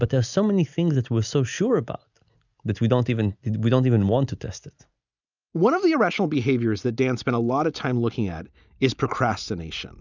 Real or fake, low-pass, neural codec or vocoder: real; 7.2 kHz; none